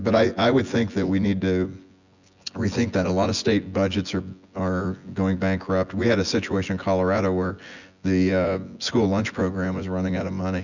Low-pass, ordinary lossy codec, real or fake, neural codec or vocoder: 7.2 kHz; Opus, 64 kbps; fake; vocoder, 24 kHz, 100 mel bands, Vocos